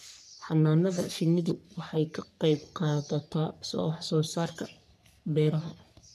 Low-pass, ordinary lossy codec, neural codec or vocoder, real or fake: 14.4 kHz; none; codec, 44.1 kHz, 3.4 kbps, Pupu-Codec; fake